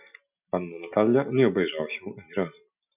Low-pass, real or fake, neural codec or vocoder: 3.6 kHz; real; none